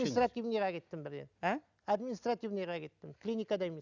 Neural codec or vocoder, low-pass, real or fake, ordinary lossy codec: none; 7.2 kHz; real; none